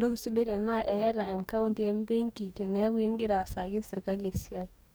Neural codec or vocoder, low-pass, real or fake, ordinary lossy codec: codec, 44.1 kHz, 2.6 kbps, DAC; none; fake; none